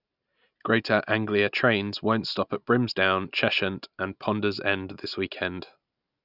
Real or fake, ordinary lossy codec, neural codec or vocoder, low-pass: real; none; none; 5.4 kHz